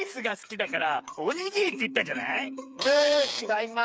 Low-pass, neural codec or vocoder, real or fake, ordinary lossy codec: none; codec, 16 kHz, 4 kbps, FreqCodec, smaller model; fake; none